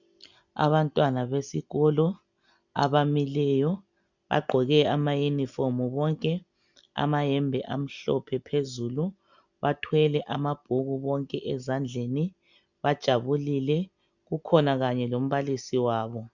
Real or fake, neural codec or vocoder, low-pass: real; none; 7.2 kHz